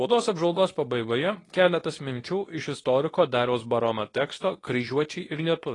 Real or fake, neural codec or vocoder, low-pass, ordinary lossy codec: fake; codec, 24 kHz, 0.9 kbps, WavTokenizer, medium speech release version 2; 10.8 kHz; AAC, 32 kbps